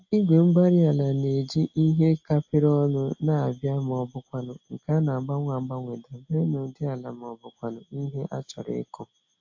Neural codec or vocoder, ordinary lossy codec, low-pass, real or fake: none; AAC, 48 kbps; 7.2 kHz; real